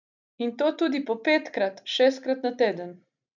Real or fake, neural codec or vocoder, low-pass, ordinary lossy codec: real; none; 7.2 kHz; none